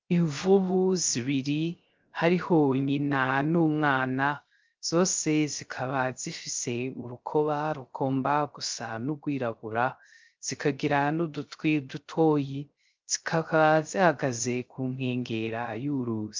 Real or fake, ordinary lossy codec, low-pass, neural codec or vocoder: fake; Opus, 24 kbps; 7.2 kHz; codec, 16 kHz, 0.3 kbps, FocalCodec